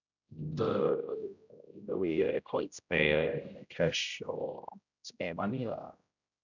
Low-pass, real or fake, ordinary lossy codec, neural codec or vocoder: 7.2 kHz; fake; none; codec, 16 kHz, 0.5 kbps, X-Codec, HuBERT features, trained on general audio